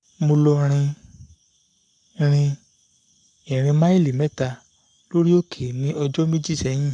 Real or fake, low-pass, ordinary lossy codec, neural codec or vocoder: fake; 9.9 kHz; none; codec, 44.1 kHz, 7.8 kbps, Pupu-Codec